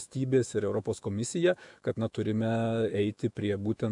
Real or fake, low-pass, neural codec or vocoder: fake; 10.8 kHz; autoencoder, 48 kHz, 128 numbers a frame, DAC-VAE, trained on Japanese speech